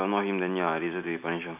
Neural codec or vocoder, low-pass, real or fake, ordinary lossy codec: none; 3.6 kHz; real; none